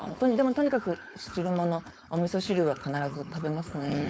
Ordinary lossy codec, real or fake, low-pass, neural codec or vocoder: none; fake; none; codec, 16 kHz, 4.8 kbps, FACodec